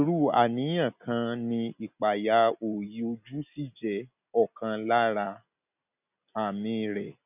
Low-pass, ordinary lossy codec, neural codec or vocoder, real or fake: 3.6 kHz; none; none; real